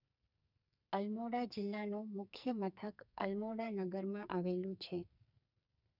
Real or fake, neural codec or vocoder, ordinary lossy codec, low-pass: fake; codec, 44.1 kHz, 2.6 kbps, SNAC; none; 5.4 kHz